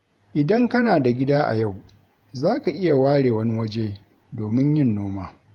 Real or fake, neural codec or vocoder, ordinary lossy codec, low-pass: fake; vocoder, 48 kHz, 128 mel bands, Vocos; Opus, 32 kbps; 19.8 kHz